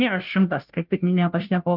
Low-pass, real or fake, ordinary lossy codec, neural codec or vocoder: 5.4 kHz; fake; Opus, 32 kbps; codec, 16 kHz, 0.5 kbps, FunCodec, trained on Chinese and English, 25 frames a second